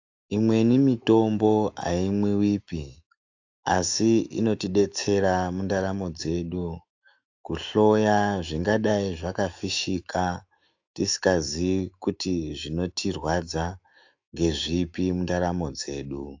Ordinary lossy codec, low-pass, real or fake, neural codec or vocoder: AAC, 48 kbps; 7.2 kHz; fake; autoencoder, 48 kHz, 128 numbers a frame, DAC-VAE, trained on Japanese speech